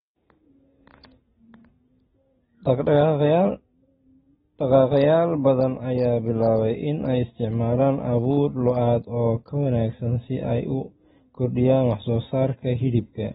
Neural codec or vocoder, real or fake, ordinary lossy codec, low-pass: none; real; AAC, 16 kbps; 9.9 kHz